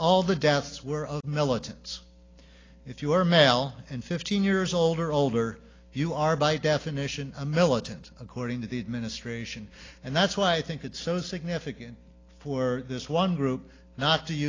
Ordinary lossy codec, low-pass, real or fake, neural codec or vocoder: AAC, 32 kbps; 7.2 kHz; real; none